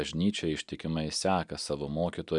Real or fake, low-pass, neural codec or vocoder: real; 10.8 kHz; none